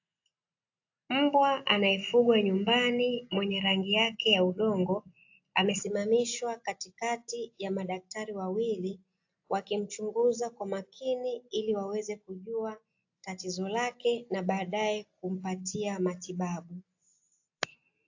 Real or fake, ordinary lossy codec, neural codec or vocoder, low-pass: real; AAC, 48 kbps; none; 7.2 kHz